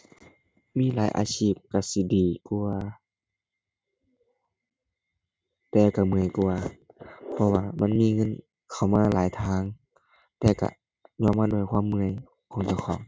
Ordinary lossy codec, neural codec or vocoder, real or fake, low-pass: none; none; real; none